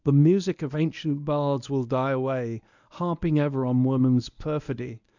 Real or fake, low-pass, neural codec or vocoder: fake; 7.2 kHz; codec, 24 kHz, 0.9 kbps, WavTokenizer, medium speech release version 1